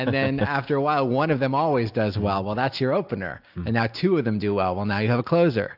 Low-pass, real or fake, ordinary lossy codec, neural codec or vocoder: 5.4 kHz; real; AAC, 48 kbps; none